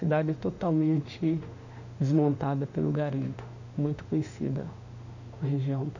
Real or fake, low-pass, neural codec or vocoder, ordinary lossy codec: fake; 7.2 kHz; autoencoder, 48 kHz, 32 numbers a frame, DAC-VAE, trained on Japanese speech; none